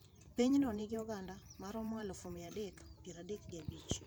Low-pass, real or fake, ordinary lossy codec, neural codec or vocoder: none; fake; none; vocoder, 44.1 kHz, 128 mel bands every 512 samples, BigVGAN v2